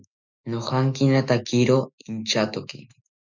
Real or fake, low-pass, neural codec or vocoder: fake; 7.2 kHz; autoencoder, 48 kHz, 128 numbers a frame, DAC-VAE, trained on Japanese speech